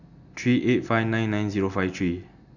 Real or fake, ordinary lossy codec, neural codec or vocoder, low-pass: real; none; none; 7.2 kHz